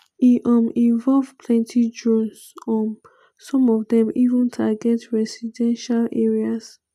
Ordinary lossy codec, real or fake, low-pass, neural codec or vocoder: none; real; 14.4 kHz; none